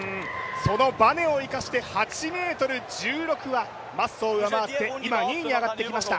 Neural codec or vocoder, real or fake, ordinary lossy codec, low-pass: none; real; none; none